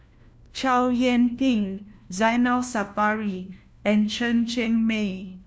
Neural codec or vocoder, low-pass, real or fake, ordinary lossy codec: codec, 16 kHz, 1 kbps, FunCodec, trained on LibriTTS, 50 frames a second; none; fake; none